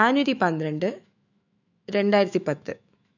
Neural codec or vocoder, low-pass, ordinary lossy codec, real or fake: autoencoder, 48 kHz, 128 numbers a frame, DAC-VAE, trained on Japanese speech; 7.2 kHz; none; fake